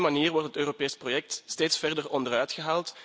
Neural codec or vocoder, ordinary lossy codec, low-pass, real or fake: none; none; none; real